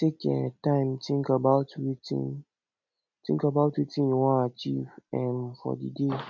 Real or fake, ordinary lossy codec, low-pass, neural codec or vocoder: real; none; 7.2 kHz; none